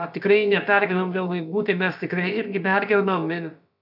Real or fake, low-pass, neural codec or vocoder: fake; 5.4 kHz; codec, 16 kHz, about 1 kbps, DyCAST, with the encoder's durations